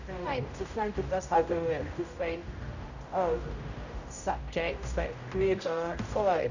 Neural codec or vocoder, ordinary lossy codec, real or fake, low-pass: codec, 16 kHz, 0.5 kbps, X-Codec, HuBERT features, trained on general audio; none; fake; 7.2 kHz